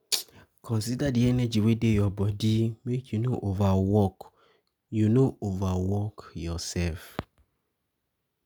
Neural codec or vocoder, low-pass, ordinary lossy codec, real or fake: none; none; none; real